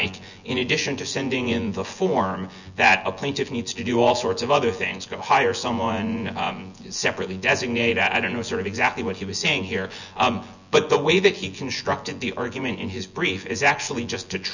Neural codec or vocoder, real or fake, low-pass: vocoder, 24 kHz, 100 mel bands, Vocos; fake; 7.2 kHz